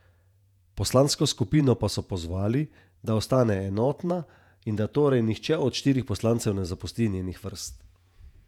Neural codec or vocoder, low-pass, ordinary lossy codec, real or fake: vocoder, 48 kHz, 128 mel bands, Vocos; 19.8 kHz; none; fake